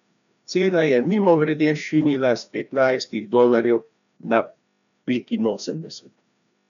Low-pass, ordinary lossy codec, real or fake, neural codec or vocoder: 7.2 kHz; none; fake; codec, 16 kHz, 1 kbps, FreqCodec, larger model